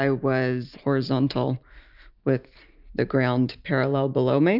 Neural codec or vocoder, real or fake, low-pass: none; real; 5.4 kHz